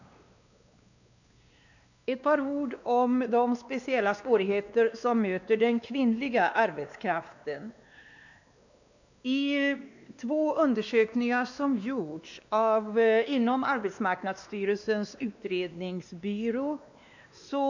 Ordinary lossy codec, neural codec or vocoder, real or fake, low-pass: none; codec, 16 kHz, 2 kbps, X-Codec, WavLM features, trained on Multilingual LibriSpeech; fake; 7.2 kHz